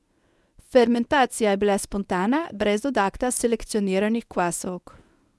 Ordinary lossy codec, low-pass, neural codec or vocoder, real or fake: none; none; codec, 24 kHz, 0.9 kbps, WavTokenizer, medium speech release version 1; fake